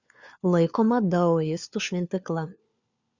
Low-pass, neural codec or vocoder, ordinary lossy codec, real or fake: 7.2 kHz; codec, 16 kHz, 4 kbps, FunCodec, trained on LibriTTS, 50 frames a second; Opus, 64 kbps; fake